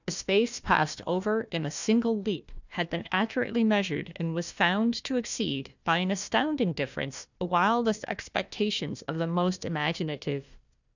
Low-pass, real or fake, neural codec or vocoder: 7.2 kHz; fake; codec, 16 kHz, 1 kbps, FunCodec, trained on Chinese and English, 50 frames a second